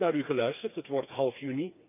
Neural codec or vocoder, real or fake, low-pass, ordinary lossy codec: codec, 16 kHz, 4 kbps, FreqCodec, smaller model; fake; 3.6 kHz; none